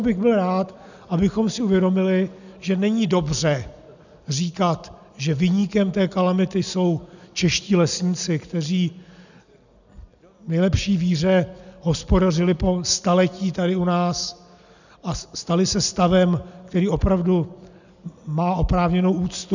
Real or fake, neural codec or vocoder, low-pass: real; none; 7.2 kHz